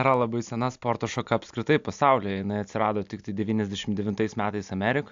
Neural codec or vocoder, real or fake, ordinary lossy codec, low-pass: none; real; AAC, 64 kbps; 7.2 kHz